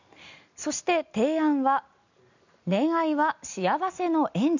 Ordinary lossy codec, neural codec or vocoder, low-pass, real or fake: none; none; 7.2 kHz; real